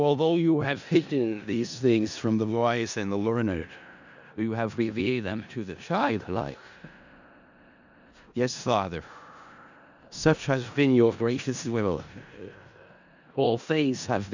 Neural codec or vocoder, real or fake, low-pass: codec, 16 kHz in and 24 kHz out, 0.4 kbps, LongCat-Audio-Codec, four codebook decoder; fake; 7.2 kHz